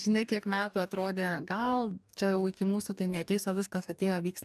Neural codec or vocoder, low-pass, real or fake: codec, 44.1 kHz, 2.6 kbps, DAC; 14.4 kHz; fake